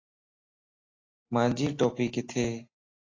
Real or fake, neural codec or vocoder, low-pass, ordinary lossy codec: real; none; 7.2 kHz; AAC, 32 kbps